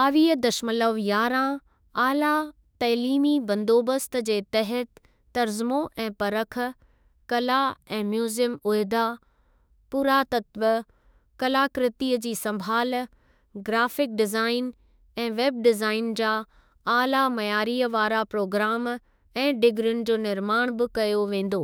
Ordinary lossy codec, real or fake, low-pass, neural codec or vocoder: none; fake; none; autoencoder, 48 kHz, 32 numbers a frame, DAC-VAE, trained on Japanese speech